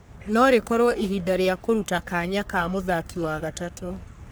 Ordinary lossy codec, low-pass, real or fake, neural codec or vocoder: none; none; fake; codec, 44.1 kHz, 3.4 kbps, Pupu-Codec